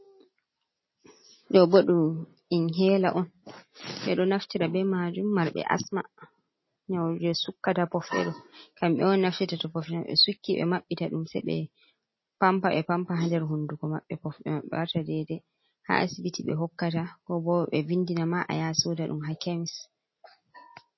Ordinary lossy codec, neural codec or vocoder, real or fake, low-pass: MP3, 24 kbps; none; real; 7.2 kHz